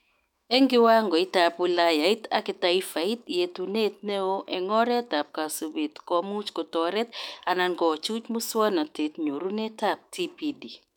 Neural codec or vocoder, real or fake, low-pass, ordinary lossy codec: autoencoder, 48 kHz, 128 numbers a frame, DAC-VAE, trained on Japanese speech; fake; 19.8 kHz; none